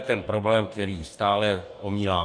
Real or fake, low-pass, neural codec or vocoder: fake; 9.9 kHz; codec, 44.1 kHz, 2.6 kbps, DAC